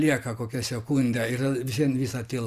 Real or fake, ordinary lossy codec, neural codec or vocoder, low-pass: fake; Opus, 64 kbps; vocoder, 44.1 kHz, 128 mel bands every 512 samples, BigVGAN v2; 14.4 kHz